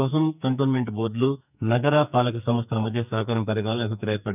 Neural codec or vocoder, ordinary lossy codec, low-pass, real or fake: codec, 44.1 kHz, 2.6 kbps, SNAC; none; 3.6 kHz; fake